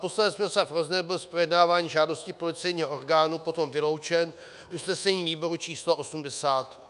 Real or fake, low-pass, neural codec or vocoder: fake; 10.8 kHz; codec, 24 kHz, 1.2 kbps, DualCodec